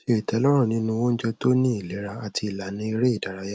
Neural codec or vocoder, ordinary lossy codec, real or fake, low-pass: none; none; real; none